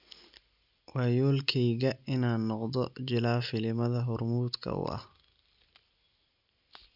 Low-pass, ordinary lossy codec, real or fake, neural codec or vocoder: 5.4 kHz; none; real; none